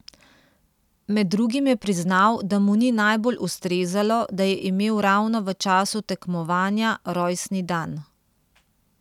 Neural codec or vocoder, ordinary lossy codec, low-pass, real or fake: none; none; 19.8 kHz; real